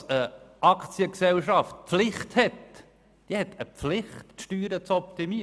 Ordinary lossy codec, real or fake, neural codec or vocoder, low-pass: none; real; none; none